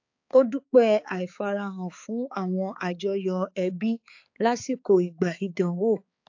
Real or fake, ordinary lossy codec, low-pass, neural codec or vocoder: fake; AAC, 48 kbps; 7.2 kHz; codec, 16 kHz, 4 kbps, X-Codec, HuBERT features, trained on balanced general audio